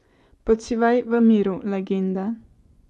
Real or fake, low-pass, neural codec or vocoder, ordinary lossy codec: fake; none; vocoder, 24 kHz, 100 mel bands, Vocos; none